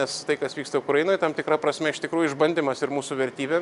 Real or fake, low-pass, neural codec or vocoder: real; 10.8 kHz; none